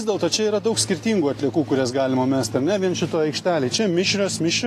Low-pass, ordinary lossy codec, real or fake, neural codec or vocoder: 14.4 kHz; AAC, 48 kbps; real; none